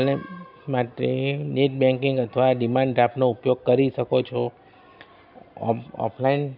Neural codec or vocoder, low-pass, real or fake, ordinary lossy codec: none; 5.4 kHz; real; none